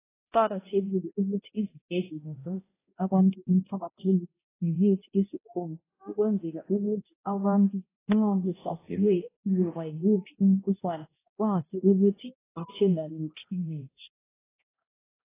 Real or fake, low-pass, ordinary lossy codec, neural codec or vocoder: fake; 3.6 kHz; AAC, 16 kbps; codec, 16 kHz, 0.5 kbps, X-Codec, HuBERT features, trained on balanced general audio